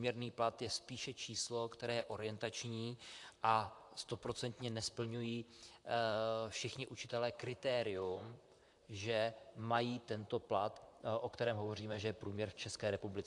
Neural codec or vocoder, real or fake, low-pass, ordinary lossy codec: vocoder, 44.1 kHz, 128 mel bands, Pupu-Vocoder; fake; 10.8 kHz; AAC, 64 kbps